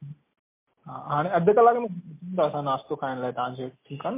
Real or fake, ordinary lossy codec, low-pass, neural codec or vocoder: real; MP3, 24 kbps; 3.6 kHz; none